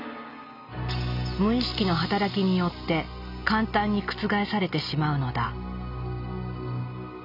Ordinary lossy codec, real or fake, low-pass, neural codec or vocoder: none; real; 5.4 kHz; none